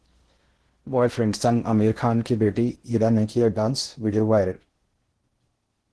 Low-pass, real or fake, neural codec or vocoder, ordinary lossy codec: 10.8 kHz; fake; codec, 16 kHz in and 24 kHz out, 0.6 kbps, FocalCodec, streaming, 4096 codes; Opus, 16 kbps